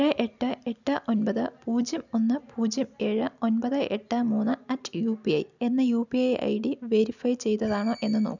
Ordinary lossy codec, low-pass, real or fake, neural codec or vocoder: none; 7.2 kHz; real; none